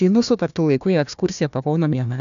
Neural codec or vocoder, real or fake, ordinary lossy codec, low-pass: codec, 16 kHz, 1 kbps, FunCodec, trained on Chinese and English, 50 frames a second; fake; MP3, 96 kbps; 7.2 kHz